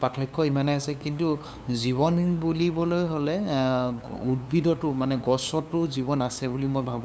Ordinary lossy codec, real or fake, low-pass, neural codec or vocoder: none; fake; none; codec, 16 kHz, 2 kbps, FunCodec, trained on LibriTTS, 25 frames a second